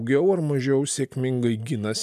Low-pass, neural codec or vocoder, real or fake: 14.4 kHz; none; real